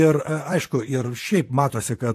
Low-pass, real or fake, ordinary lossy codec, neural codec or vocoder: 14.4 kHz; fake; AAC, 64 kbps; vocoder, 44.1 kHz, 128 mel bands, Pupu-Vocoder